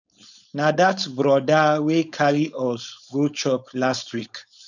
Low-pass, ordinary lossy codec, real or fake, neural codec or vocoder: 7.2 kHz; none; fake; codec, 16 kHz, 4.8 kbps, FACodec